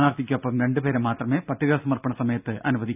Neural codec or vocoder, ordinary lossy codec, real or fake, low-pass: none; none; real; 3.6 kHz